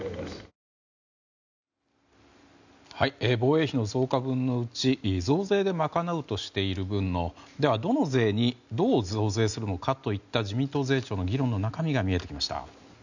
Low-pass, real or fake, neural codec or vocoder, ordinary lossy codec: 7.2 kHz; real; none; none